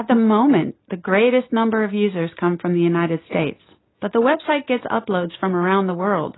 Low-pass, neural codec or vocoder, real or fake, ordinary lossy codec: 7.2 kHz; none; real; AAC, 16 kbps